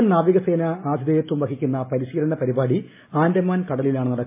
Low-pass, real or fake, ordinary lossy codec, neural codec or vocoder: 3.6 kHz; real; MP3, 24 kbps; none